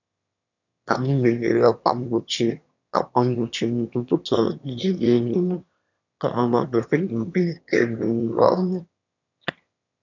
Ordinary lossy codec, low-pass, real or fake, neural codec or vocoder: none; 7.2 kHz; fake; autoencoder, 22.05 kHz, a latent of 192 numbers a frame, VITS, trained on one speaker